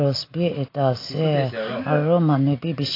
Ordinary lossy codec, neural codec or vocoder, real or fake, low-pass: AAC, 32 kbps; none; real; 5.4 kHz